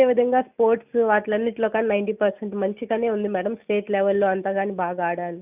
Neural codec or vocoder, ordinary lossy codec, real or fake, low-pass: none; none; real; 3.6 kHz